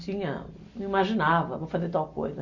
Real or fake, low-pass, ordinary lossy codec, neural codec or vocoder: real; 7.2 kHz; Opus, 64 kbps; none